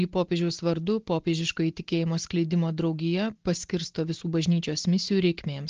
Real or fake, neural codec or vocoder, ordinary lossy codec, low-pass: real; none; Opus, 16 kbps; 7.2 kHz